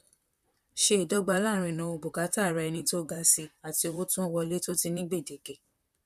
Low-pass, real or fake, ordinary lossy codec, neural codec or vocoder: 14.4 kHz; fake; none; vocoder, 44.1 kHz, 128 mel bands, Pupu-Vocoder